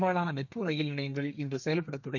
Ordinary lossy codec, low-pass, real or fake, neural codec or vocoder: none; 7.2 kHz; fake; codec, 32 kHz, 1.9 kbps, SNAC